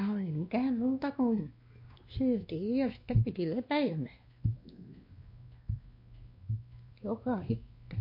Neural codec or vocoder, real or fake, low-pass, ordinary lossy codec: codec, 16 kHz, 2 kbps, X-Codec, WavLM features, trained on Multilingual LibriSpeech; fake; 5.4 kHz; MP3, 32 kbps